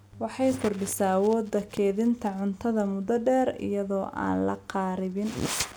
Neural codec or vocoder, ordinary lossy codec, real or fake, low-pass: none; none; real; none